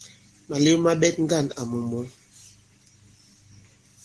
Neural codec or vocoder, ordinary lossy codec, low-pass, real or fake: none; Opus, 16 kbps; 10.8 kHz; real